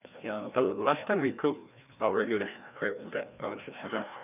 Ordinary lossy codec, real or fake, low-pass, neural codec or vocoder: none; fake; 3.6 kHz; codec, 16 kHz, 1 kbps, FreqCodec, larger model